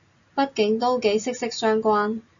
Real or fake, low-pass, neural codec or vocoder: real; 7.2 kHz; none